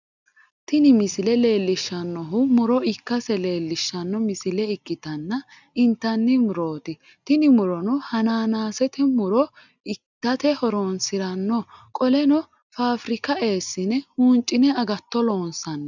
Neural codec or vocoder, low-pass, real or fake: none; 7.2 kHz; real